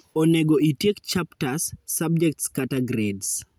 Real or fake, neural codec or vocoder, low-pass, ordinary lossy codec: real; none; none; none